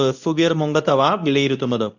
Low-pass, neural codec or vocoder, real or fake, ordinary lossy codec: 7.2 kHz; codec, 24 kHz, 0.9 kbps, WavTokenizer, medium speech release version 2; fake; none